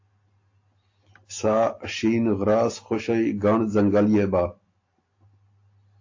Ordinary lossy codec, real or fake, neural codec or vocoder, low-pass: AAC, 48 kbps; real; none; 7.2 kHz